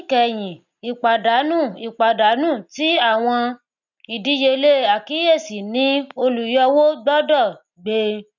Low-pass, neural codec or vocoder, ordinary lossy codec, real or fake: 7.2 kHz; none; none; real